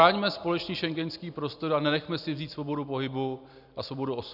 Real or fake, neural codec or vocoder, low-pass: real; none; 5.4 kHz